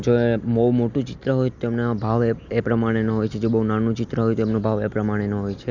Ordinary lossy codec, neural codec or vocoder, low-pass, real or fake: none; none; 7.2 kHz; real